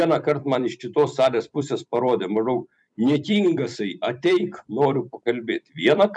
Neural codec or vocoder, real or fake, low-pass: vocoder, 44.1 kHz, 128 mel bands every 256 samples, BigVGAN v2; fake; 10.8 kHz